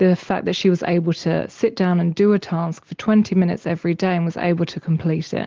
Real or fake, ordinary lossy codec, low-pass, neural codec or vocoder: real; Opus, 16 kbps; 7.2 kHz; none